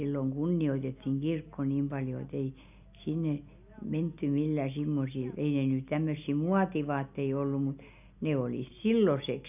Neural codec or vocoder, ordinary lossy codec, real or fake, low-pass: none; none; real; 3.6 kHz